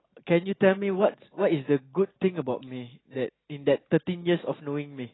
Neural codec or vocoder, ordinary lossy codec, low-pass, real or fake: none; AAC, 16 kbps; 7.2 kHz; real